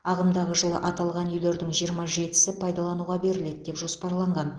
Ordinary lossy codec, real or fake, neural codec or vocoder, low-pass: Opus, 16 kbps; real; none; 9.9 kHz